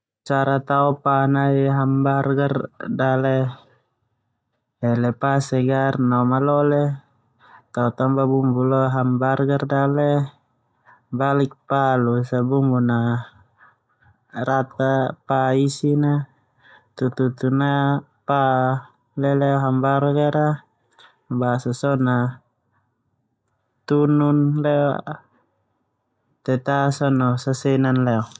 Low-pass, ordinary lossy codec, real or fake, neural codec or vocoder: none; none; real; none